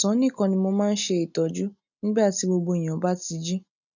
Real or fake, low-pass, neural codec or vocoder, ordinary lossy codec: real; 7.2 kHz; none; none